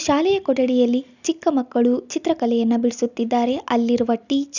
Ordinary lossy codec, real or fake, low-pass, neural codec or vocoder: none; real; 7.2 kHz; none